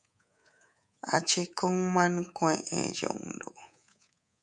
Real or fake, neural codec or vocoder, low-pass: fake; codec, 24 kHz, 3.1 kbps, DualCodec; 10.8 kHz